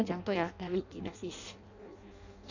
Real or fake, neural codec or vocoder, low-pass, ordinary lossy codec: fake; codec, 16 kHz in and 24 kHz out, 0.6 kbps, FireRedTTS-2 codec; 7.2 kHz; none